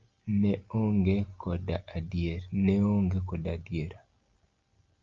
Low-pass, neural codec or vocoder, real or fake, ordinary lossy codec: 7.2 kHz; none; real; Opus, 24 kbps